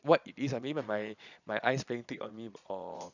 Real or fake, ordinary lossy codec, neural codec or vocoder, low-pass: fake; none; vocoder, 22.05 kHz, 80 mel bands, Vocos; 7.2 kHz